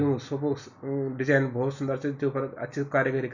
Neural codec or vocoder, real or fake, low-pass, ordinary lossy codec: none; real; 7.2 kHz; none